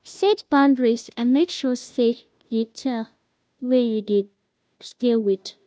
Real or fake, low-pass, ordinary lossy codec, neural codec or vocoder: fake; none; none; codec, 16 kHz, 0.5 kbps, FunCodec, trained on Chinese and English, 25 frames a second